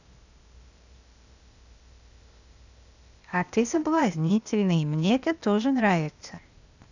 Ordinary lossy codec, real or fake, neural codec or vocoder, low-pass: none; fake; codec, 16 kHz, 0.8 kbps, ZipCodec; 7.2 kHz